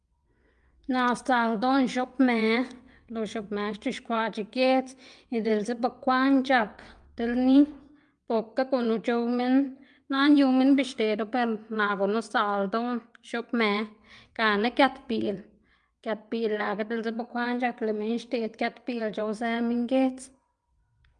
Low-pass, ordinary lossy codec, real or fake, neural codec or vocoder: 9.9 kHz; Opus, 32 kbps; fake; vocoder, 22.05 kHz, 80 mel bands, WaveNeXt